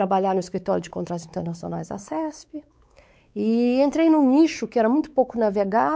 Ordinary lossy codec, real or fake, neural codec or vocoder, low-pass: none; fake; codec, 16 kHz, 4 kbps, X-Codec, WavLM features, trained on Multilingual LibriSpeech; none